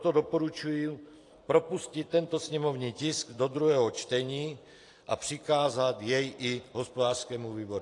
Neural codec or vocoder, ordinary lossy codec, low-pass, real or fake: vocoder, 44.1 kHz, 128 mel bands every 256 samples, BigVGAN v2; AAC, 48 kbps; 10.8 kHz; fake